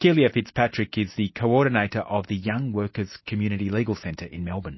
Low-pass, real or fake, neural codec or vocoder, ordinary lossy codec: 7.2 kHz; real; none; MP3, 24 kbps